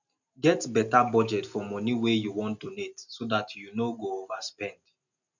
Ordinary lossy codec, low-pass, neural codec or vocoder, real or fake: none; 7.2 kHz; none; real